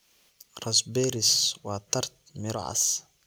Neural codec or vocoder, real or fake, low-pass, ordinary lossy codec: none; real; none; none